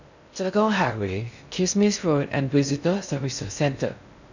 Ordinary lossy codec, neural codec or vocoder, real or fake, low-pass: none; codec, 16 kHz in and 24 kHz out, 0.6 kbps, FocalCodec, streaming, 2048 codes; fake; 7.2 kHz